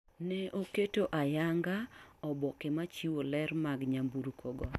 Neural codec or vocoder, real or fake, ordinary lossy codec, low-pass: none; real; none; 14.4 kHz